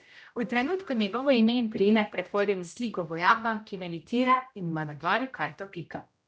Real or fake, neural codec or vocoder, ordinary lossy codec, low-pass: fake; codec, 16 kHz, 0.5 kbps, X-Codec, HuBERT features, trained on general audio; none; none